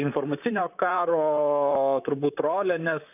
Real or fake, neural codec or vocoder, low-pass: fake; vocoder, 44.1 kHz, 128 mel bands, Pupu-Vocoder; 3.6 kHz